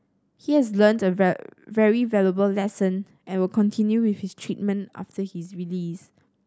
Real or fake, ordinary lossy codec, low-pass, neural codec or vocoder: real; none; none; none